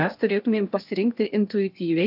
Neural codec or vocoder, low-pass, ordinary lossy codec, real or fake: codec, 16 kHz in and 24 kHz out, 0.8 kbps, FocalCodec, streaming, 65536 codes; 5.4 kHz; AAC, 48 kbps; fake